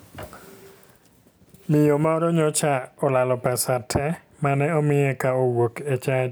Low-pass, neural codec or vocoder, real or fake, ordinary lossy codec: none; none; real; none